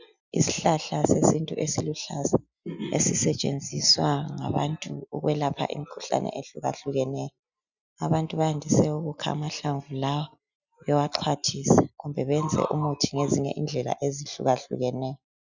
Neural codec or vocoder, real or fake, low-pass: none; real; 7.2 kHz